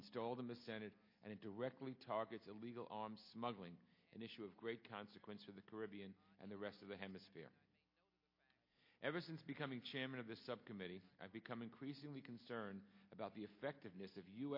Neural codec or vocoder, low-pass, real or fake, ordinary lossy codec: none; 5.4 kHz; real; MP3, 32 kbps